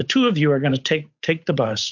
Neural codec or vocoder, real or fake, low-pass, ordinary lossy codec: codec, 16 kHz, 8 kbps, FunCodec, trained on Chinese and English, 25 frames a second; fake; 7.2 kHz; MP3, 48 kbps